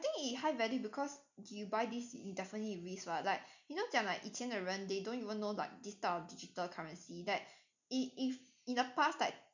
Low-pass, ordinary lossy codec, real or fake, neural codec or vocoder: 7.2 kHz; none; real; none